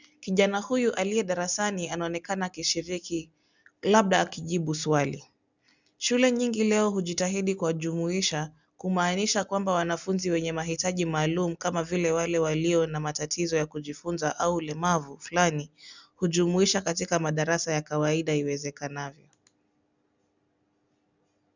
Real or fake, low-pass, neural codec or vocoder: real; 7.2 kHz; none